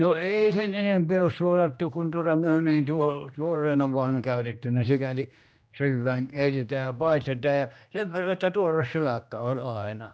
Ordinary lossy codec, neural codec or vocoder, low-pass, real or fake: none; codec, 16 kHz, 1 kbps, X-Codec, HuBERT features, trained on general audio; none; fake